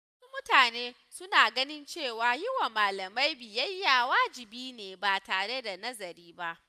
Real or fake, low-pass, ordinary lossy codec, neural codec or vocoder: real; 14.4 kHz; none; none